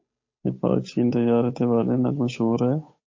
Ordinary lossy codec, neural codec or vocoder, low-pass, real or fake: MP3, 32 kbps; codec, 16 kHz, 8 kbps, FunCodec, trained on Chinese and English, 25 frames a second; 7.2 kHz; fake